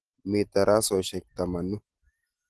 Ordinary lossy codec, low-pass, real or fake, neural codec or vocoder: Opus, 16 kbps; 10.8 kHz; real; none